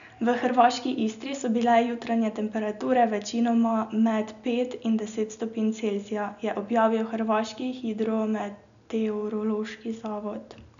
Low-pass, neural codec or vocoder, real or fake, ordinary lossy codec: 7.2 kHz; none; real; none